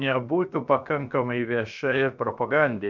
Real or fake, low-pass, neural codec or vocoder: fake; 7.2 kHz; codec, 16 kHz, 0.7 kbps, FocalCodec